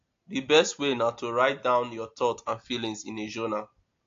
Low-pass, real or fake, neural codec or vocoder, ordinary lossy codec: 7.2 kHz; real; none; AAC, 48 kbps